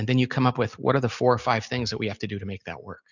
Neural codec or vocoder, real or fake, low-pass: none; real; 7.2 kHz